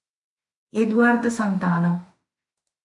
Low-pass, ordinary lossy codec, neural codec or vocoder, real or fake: 10.8 kHz; MP3, 64 kbps; autoencoder, 48 kHz, 32 numbers a frame, DAC-VAE, trained on Japanese speech; fake